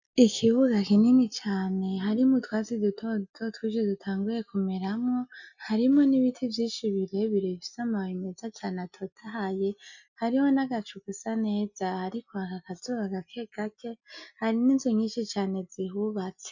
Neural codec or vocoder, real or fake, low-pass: none; real; 7.2 kHz